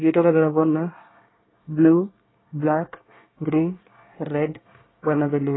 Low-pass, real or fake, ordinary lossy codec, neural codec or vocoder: 7.2 kHz; fake; AAC, 16 kbps; codec, 24 kHz, 1 kbps, SNAC